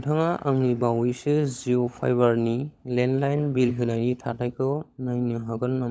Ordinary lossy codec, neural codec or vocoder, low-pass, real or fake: none; codec, 16 kHz, 16 kbps, FunCodec, trained on LibriTTS, 50 frames a second; none; fake